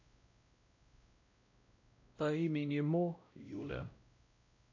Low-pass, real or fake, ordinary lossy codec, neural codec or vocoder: 7.2 kHz; fake; AAC, 48 kbps; codec, 16 kHz, 0.5 kbps, X-Codec, WavLM features, trained on Multilingual LibriSpeech